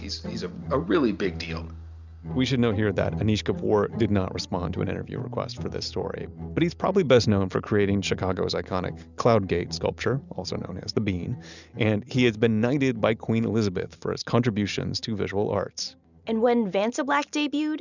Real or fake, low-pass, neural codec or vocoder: real; 7.2 kHz; none